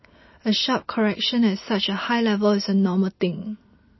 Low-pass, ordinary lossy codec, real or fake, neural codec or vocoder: 7.2 kHz; MP3, 24 kbps; real; none